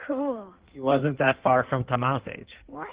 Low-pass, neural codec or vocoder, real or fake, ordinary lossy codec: 3.6 kHz; codec, 16 kHz in and 24 kHz out, 1.1 kbps, FireRedTTS-2 codec; fake; Opus, 16 kbps